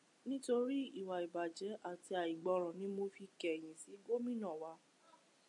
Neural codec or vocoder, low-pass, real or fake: none; 10.8 kHz; real